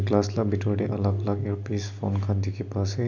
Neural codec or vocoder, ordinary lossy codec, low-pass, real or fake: none; none; 7.2 kHz; real